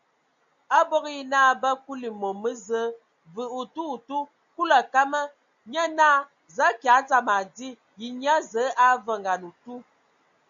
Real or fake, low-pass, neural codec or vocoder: real; 7.2 kHz; none